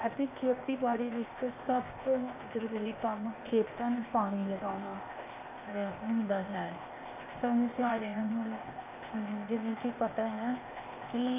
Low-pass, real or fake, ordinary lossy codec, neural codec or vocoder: 3.6 kHz; fake; AAC, 32 kbps; codec, 16 kHz, 0.8 kbps, ZipCodec